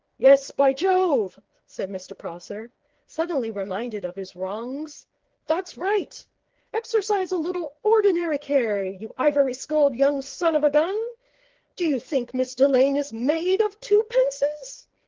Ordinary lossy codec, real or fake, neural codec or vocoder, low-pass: Opus, 16 kbps; fake; codec, 16 kHz, 4 kbps, FreqCodec, smaller model; 7.2 kHz